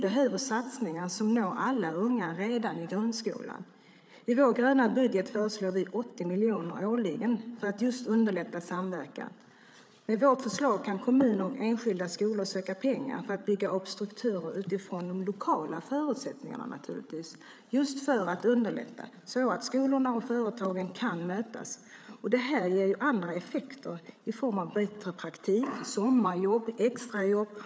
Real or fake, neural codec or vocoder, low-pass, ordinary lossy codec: fake; codec, 16 kHz, 8 kbps, FreqCodec, larger model; none; none